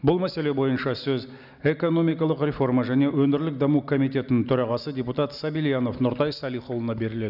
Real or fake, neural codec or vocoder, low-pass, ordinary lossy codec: real; none; 5.4 kHz; none